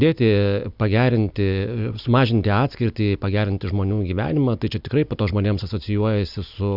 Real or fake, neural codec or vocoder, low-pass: real; none; 5.4 kHz